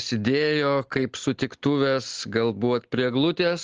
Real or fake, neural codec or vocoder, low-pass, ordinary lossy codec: real; none; 7.2 kHz; Opus, 16 kbps